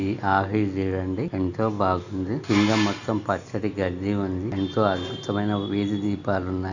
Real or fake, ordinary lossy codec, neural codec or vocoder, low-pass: real; none; none; 7.2 kHz